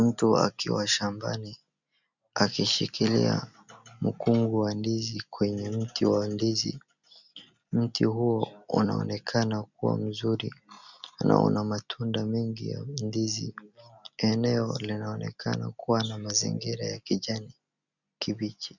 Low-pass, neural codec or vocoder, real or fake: 7.2 kHz; none; real